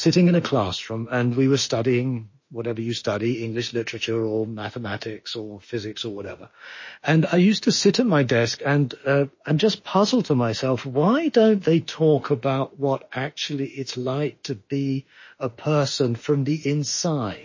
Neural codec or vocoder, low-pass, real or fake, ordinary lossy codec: autoencoder, 48 kHz, 32 numbers a frame, DAC-VAE, trained on Japanese speech; 7.2 kHz; fake; MP3, 32 kbps